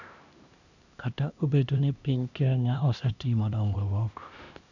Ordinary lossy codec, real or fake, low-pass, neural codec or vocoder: none; fake; 7.2 kHz; codec, 16 kHz, 1 kbps, X-Codec, HuBERT features, trained on LibriSpeech